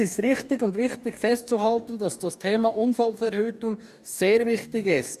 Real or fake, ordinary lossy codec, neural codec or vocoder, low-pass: fake; none; codec, 44.1 kHz, 2.6 kbps, DAC; 14.4 kHz